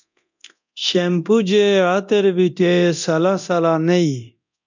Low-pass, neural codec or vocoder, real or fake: 7.2 kHz; codec, 24 kHz, 0.9 kbps, DualCodec; fake